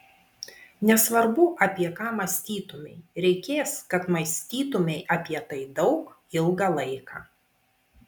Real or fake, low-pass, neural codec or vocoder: real; 19.8 kHz; none